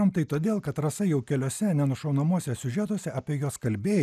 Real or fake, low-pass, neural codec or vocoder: real; 14.4 kHz; none